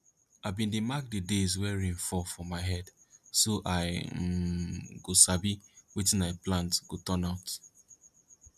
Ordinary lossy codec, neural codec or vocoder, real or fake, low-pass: none; none; real; 14.4 kHz